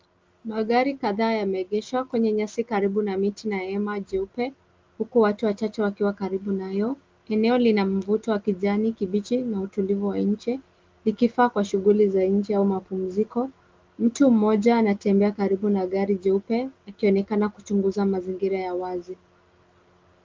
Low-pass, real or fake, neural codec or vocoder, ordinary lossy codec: 7.2 kHz; real; none; Opus, 32 kbps